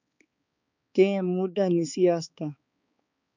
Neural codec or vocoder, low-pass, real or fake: codec, 16 kHz, 4 kbps, X-Codec, HuBERT features, trained on balanced general audio; 7.2 kHz; fake